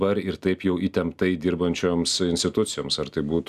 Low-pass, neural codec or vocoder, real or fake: 14.4 kHz; none; real